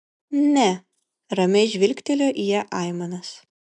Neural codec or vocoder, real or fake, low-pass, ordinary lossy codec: none; real; 10.8 kHz; MP3, 96 kbps